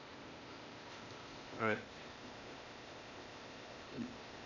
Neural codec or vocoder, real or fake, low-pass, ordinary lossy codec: codec, 16 kHz, 0.8 kbps, ZipCodec; fake; 7.2 kHz; none